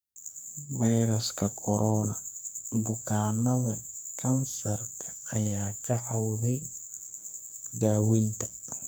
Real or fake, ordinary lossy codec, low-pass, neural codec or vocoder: fake; none; none; codec, 44.1 kHz, 2.6 kbps, SNAC